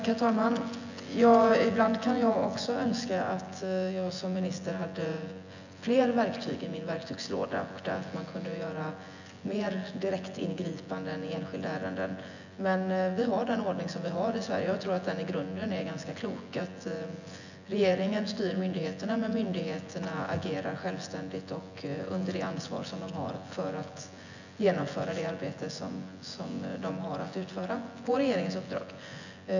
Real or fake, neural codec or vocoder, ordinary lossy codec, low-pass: fake; vocoder, 24 kHz, 100 mel bands, Vocos; none; 7.2 kHz